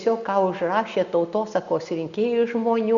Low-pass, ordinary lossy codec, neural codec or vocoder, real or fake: 7.2 kHz; Opus, 64 kbps; none; real